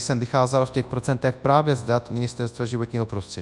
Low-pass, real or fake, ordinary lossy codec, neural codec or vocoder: 10.8 kHz; fake; MP3, 96 kbps; codec, 24 kHz, 0.9 kbps, WavTokenizer, large speech release